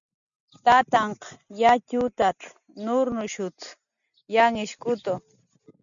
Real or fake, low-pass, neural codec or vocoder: real; 7.2 kHz; none